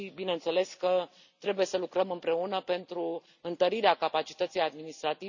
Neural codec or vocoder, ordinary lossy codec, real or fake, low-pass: none; none; real; 7.2 kHz